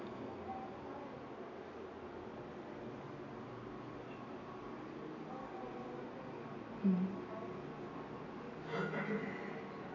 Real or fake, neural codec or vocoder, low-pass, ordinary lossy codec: real; none; 7.2 kHz; none